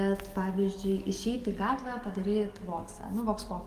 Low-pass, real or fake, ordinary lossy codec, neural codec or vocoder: 14.4 kHz; fake; Opus, 24 kbps; codec, 44.1 kHz, 7.8 kbps, DAC